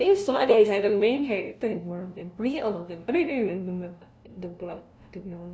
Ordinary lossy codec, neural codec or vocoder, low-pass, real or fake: none; codec, 16 kHz, 0.5 kbps, FunCodec, trained on LibriTTS, 25 frames a second; none; fake